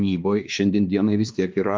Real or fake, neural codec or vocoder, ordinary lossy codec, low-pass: fake; codec, 16 kHz, 2 kbps, X-Codec, WavLM features, trained on Multilingual LibriSpeech; Opus, 32 kbps; 7.2 kHz